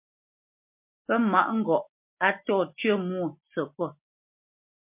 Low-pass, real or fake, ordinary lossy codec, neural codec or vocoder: 3.6 kHz; real; MP3, 32 kbps; none